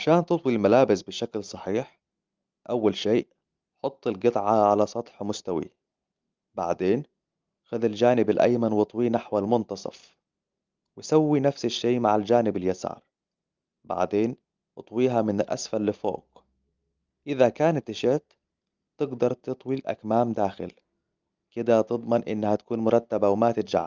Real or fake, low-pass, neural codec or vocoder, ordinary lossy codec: real; 7.2 kHz; none; Opus, 32 kbps